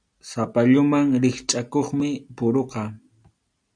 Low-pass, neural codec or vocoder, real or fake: 9.9 kHz; none; real